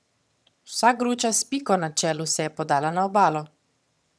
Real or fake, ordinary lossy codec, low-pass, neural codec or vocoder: fake; none; none; vocoder, 22.05 kHz, 80 mel bands, HiFi-GAN